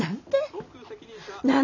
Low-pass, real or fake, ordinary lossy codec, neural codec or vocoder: 7.2 kHz; real; none; none